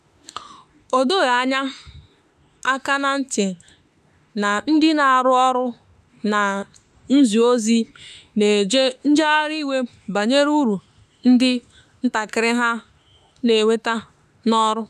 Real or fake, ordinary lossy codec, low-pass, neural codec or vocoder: fake; none; 14.4 kHz; autoencoder, 48 kHz, 32 numbers a frame, DAC-VAE, trained on Japanese speech